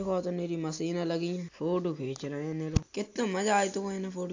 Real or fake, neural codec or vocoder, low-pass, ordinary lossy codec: real; none; 7.2 kHz; AAC, 48 kbps